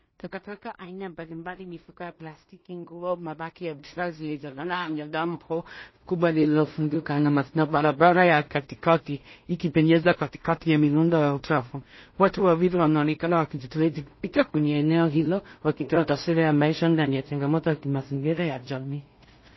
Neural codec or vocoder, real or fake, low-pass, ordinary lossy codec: codec, 16 kHz in and 24 kHz out, 0.4 kbps, LongCat-Audio-Codec, two codebook decoder; fake; 7.2 kHz; MP3, 24 kbps